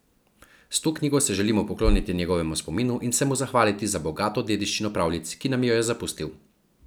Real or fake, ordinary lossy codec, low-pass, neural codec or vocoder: real; none; none; none